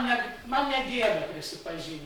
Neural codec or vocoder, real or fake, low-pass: codec, 44.1 kHz, 7.8 kbps, Pupu-Codec; fake; 19.8 kHz